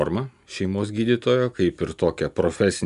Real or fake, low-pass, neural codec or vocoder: real; 10.8 kHz; none